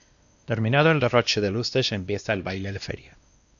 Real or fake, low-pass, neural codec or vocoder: fake; 7.2 kHz; codec, 16 kHz, 1 kbps, X-Codec, WavLM features, trained on Multilingual LibriSpeech